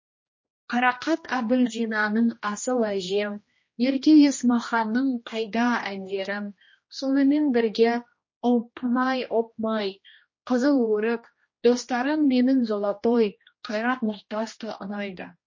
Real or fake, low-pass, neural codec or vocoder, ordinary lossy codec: fake; 7.2 kHz; codec, 16 kHz, 1 kbps, X-Codec, HuBERT features, trained on general audio; MP3, 32 kbps